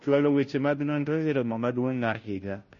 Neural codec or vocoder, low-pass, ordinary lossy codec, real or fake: codec, 16 kHz, 0.5 kbps, FunCodec, trained on Chinese and English, 25 frames a second; 7.2 kHz; MP3, 32 kbps; fake